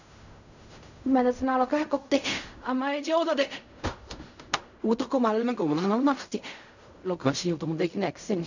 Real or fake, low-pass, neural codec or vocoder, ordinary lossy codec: fake; 7.2 kHz; codec, 16 kHz in and 24 kHz out, 0.4 kbps, LongCat-Audio-Codec, fine tuned four codebook decoder; none